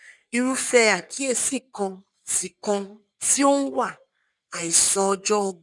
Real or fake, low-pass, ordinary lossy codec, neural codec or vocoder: fake; 10.8 kHz; none; codec, 44.1 kHz, 3.4 kbps, Pupu-Codec